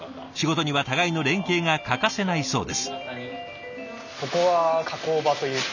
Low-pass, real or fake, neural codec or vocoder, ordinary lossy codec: 7.2 kHz; real; none; none